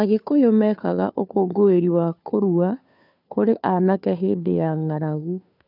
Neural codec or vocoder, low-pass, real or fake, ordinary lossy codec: codec, 16 kHz, 2 kbps, FunCodec, trained on Chinese and English, 25 frames a second; 5.4 kHz; fake; none